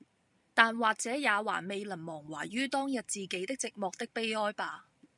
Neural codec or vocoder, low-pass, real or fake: none; 10.8 kHz; real